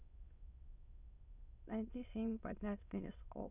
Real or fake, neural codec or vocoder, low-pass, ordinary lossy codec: fake; autoencoder, 22.05 kHz, a latent of 192 numbers a frame, VITS, trained on many speakers; 3.6 kHz; none